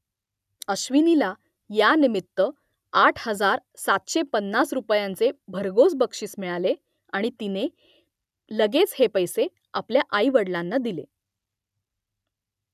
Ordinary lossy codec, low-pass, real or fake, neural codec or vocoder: none; 14.4 kHz; real; none